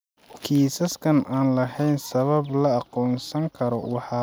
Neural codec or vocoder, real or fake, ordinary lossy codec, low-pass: none; real; none; none